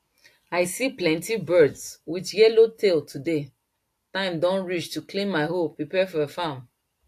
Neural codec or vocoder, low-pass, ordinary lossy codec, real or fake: none; 14.4 kHz; AAC, 64 kbps; real